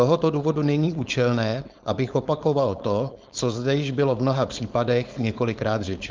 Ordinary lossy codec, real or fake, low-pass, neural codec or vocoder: Opus, 32 kbps; fake; 7.2 kHz; codec, 16 kHz, 4.8 kbps, FACodec